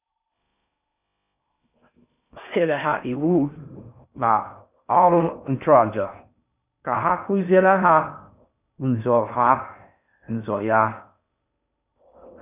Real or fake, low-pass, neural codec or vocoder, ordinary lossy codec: fake; 3.6 kHz; codec, 16 kHz in and 24 kHz out, 0.6 kbps, FocalCodec, streaming, 4096 codes; none